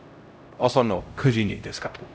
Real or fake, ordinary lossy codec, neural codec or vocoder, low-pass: fake; none; codec, 16 kHz, 0.5 kbps, X-Codec, HuBERT features, trained on LibriSpeech; none